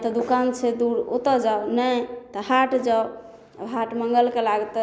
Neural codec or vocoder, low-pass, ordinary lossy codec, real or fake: none; none; none; real